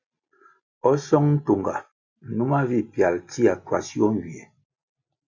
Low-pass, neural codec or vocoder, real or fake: 7.2 kHz; none; real